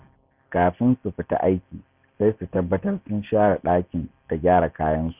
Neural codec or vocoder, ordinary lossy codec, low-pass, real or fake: none; Opus, 64 kbps; 3.6 kHz; real